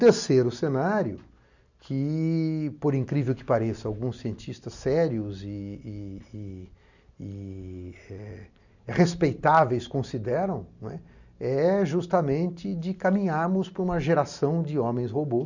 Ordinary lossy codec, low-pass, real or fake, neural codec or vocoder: none; 7.2 kHz; real; none